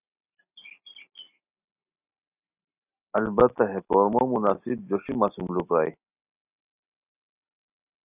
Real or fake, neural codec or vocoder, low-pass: real; none; 3.6 kHz